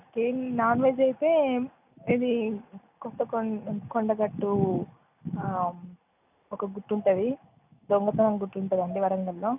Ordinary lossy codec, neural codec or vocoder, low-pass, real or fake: none; none; 3.6 kHz; real